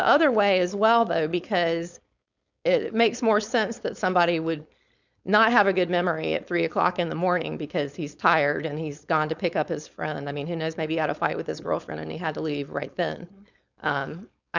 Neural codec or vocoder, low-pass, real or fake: codec, 16 kHz, 4.8 kbps, FACodec; 7.2 kHz; fake